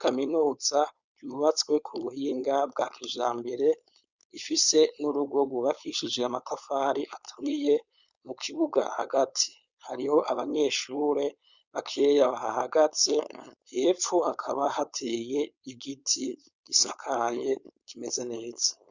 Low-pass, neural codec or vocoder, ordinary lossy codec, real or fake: 7.2 kHz; codec, 16 kHz, 4.8 kbps, FACodec; Opus, 64 kbps; fake